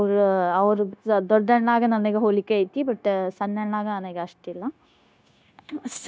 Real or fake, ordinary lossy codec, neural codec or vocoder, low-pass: fake; none; codec, 16 kHz, 0.9 kbps, LongCat-Audio-Codec; none